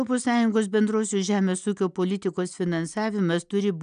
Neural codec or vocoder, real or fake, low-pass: none; real; 9.9 kHz